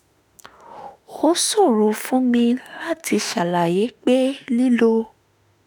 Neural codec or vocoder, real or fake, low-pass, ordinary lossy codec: autoencoder, 48 kHz, 32 numbers a frame, DAC-VAE, trained on Japanese speech; fake; none; none